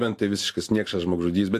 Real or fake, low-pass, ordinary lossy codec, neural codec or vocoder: real; 14.4 kHz; AAC, 64 kbps; none